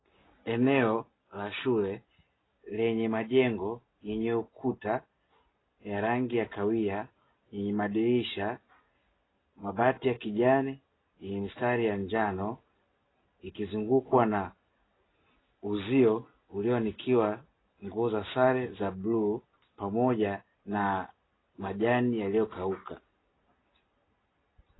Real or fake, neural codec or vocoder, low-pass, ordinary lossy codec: real; none; 7.2 kHz; AAC, 16 kbps